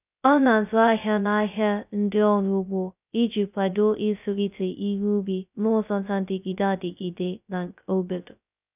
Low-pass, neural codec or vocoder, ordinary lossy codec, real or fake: 3.6 kHz; codec, 16 kHz, 0.2 kbps, FocalCodec; none; fake